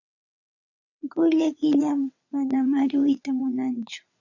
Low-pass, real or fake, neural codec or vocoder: 7.2 kHz; fake; vocoder, 22.05 kHz, 80 mel bands, WaveNeXt